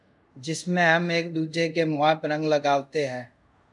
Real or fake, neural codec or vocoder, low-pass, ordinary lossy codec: fake; codec, 24 kHz, 0.5 kbps, DualCodec; 10.8 kHz; MP3, 96 kbps